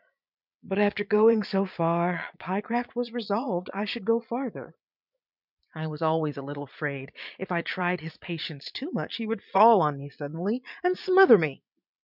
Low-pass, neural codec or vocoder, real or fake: 5.4 kHz; vocoder, 22.05 kHz, 80 mel bands, Vocos; fake